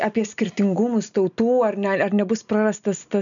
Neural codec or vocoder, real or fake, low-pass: none; real; 7.2 kHz